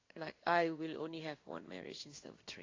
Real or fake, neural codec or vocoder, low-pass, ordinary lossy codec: fake; codec, 16 kHz in and 24 kHz out, 1 kbps, XY-Tokenizer; 7.2 kHz; AAC, 32 kbps